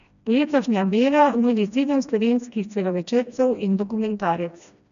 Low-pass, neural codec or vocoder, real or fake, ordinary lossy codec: 7.2 kHz; codec, 16 kHz, 1 kbps, FreqCodec, smaller model; fake; none